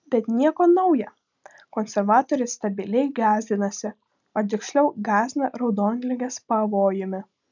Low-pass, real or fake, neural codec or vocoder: 7.2 kHz; real; none